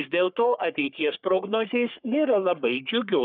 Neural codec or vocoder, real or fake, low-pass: codec, 44.1 kHz, 3.4 kbps, Pupu-Codec; fake; 5.4 kHz